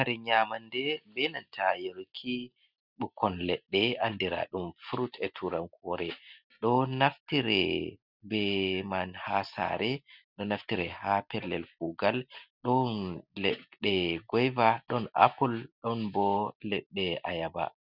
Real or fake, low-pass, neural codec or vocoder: real; 5.4 kHz; none